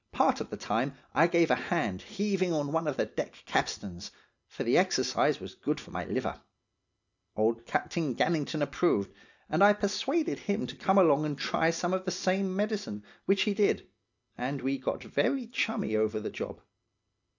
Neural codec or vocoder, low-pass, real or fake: none; 7.2 kHz; real